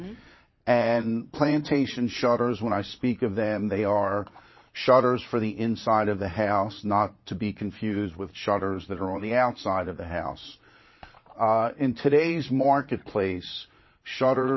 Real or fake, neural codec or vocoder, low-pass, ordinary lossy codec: fake; vocoder, 22.05 kHz, 80 mel bands, Vocos; 7.2 kHz; MP3, 24 kbps